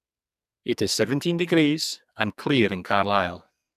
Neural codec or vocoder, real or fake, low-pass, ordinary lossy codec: codec, 44.1 kHz, 2.6 kbps, SNAC; fake; 14.4 kHz; none